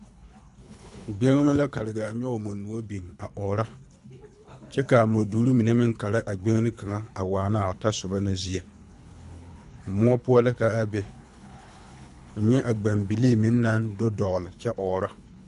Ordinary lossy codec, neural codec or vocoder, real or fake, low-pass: MP3, 96 kbps; codec, 24 kHz, 3 kbps, HILCodec; fake; 10.8 kHz